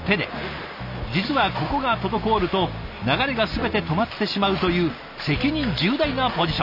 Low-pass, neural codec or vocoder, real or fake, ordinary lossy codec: 5.4 kHz; none; real; none